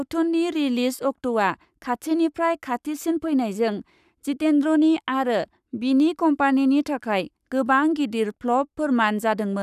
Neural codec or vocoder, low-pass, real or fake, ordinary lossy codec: codec, 44.1 kHz, 7.8 kbps, Pupu-Codec; 14.4 kHz; fake; none